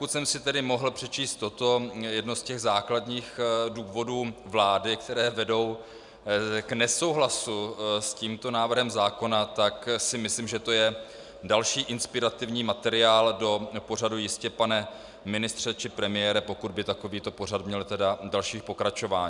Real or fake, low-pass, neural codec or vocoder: real; 10.8 kHz; none